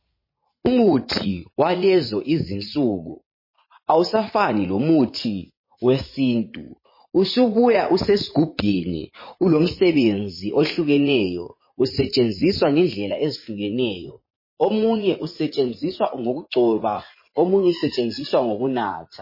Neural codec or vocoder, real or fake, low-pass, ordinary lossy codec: vocoder, 44.1 kHz, 80 mel bands, Vocos; fake; 5.4 kHz; MP3, 24 kbps